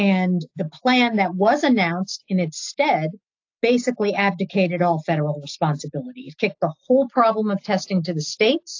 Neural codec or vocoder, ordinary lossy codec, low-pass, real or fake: none; AAC, 48 kbps; 7.2 kHz; real